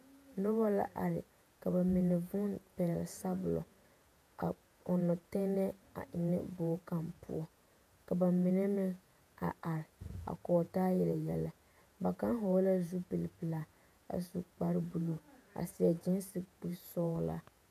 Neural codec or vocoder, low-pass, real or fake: vocoder, 48 kHz, 128 mel bands, Vocos; 14.4 kHz; fake